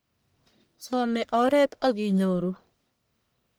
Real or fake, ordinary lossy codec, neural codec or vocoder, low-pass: fake; none; codec, 44.1 kHz, 1.7 kbps, Pupu-Codec; none